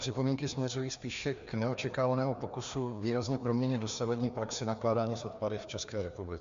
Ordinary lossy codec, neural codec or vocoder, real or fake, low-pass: MP3, 64 kbps; codec, 16 kHz, 2 kbps, FreqCodec, larger model; fake; 7.2 kHz